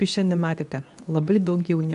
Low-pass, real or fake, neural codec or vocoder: 10.8 kHz; fake; codec, 24 kHz, 0.9 kbps, WavTokenizer, medium speech release version 2